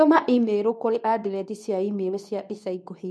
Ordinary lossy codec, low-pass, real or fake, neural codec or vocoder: none; none; fake; codec, 24 kHz, 0.9 kbps, WavTokenizer, medium speech release version 2